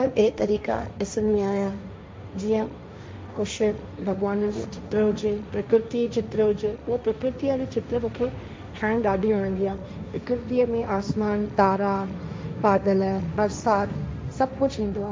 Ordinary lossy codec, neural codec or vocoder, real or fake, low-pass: none; codec, 16 kHz, 1.1 kbps, Voila-Tokenizer; fake; none